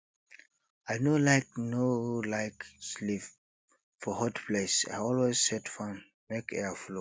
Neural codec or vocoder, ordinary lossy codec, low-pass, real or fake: none; none; none; real